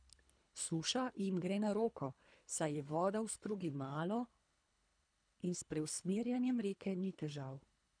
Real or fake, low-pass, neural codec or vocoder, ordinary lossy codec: fake; 9.9 kHz; codec, 24 kHz, 3 kbps, HILCodec; none